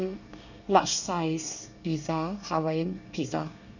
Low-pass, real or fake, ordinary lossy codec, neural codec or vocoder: 7.2 kHz; fake; none; codec, 24 kHz, 1 kbps, SNAC